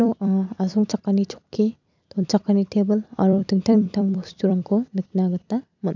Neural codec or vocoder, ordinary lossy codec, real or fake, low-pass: vocoder, 44.1 kHz, 128 mel bands every 256 samples, BigVGAN v2; none; fake; 7.2 kHz